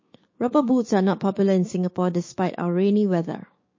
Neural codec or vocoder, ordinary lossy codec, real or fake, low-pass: codec, 16 kHz, 6 kbps, DAC; MP3, 32 kbps; fake; 7.2 kHz